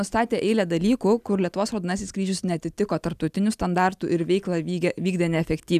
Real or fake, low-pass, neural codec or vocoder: real; 14.4 kHz; none